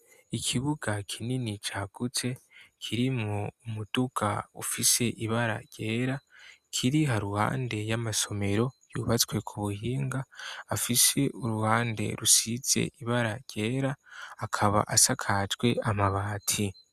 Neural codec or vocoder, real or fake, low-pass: none; real; 14.4 kHz